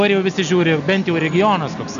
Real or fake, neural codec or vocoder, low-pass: real; none; 7.2 kHz